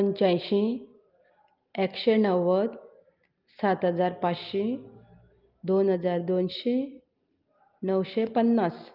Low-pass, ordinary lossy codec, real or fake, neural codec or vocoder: 5.4 kHz; Opus, 24 kbps; real; none